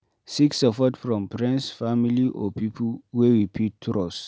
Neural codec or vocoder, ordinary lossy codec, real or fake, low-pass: none; none; real; none